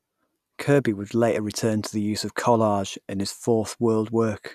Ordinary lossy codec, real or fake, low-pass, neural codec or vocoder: none; real; 14.4 kHz; none